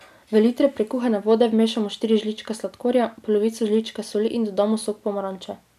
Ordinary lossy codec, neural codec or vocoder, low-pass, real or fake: none; none; 14.4 kHz; real